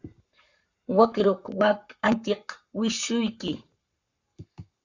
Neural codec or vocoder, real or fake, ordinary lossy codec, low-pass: codec, 44.1 kHz, 7.8 kbps, Pupu-Codec; fake; Opus, 64 kbps; 7.2 kHz